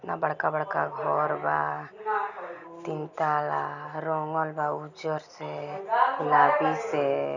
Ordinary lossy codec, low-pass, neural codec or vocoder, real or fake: none; 7.2 kHz; none; real